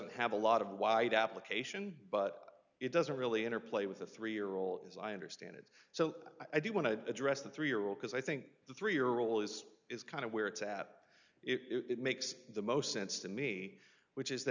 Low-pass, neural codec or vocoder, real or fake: 7.2 kHz; none; real